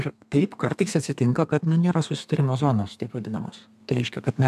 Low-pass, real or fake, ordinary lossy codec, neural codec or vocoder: 14.4 kHz; fake; AAC, 96 kbps; codec, 32 kHz, 1.9 kbps, SNAC